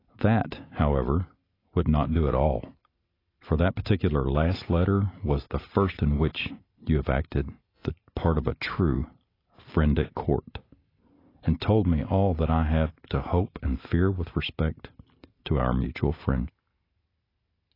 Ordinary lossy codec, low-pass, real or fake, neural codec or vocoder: AAC, 24 kbps; 5.4 kHz; real; none